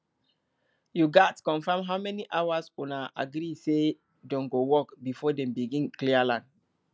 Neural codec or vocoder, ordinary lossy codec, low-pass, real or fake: none; none; none; real